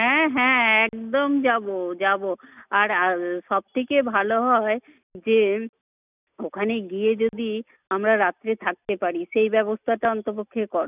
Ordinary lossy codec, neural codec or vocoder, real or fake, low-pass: none; none; real; 3.6 kHz